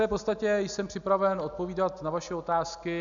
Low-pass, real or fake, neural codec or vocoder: 7.2 kHz; real; none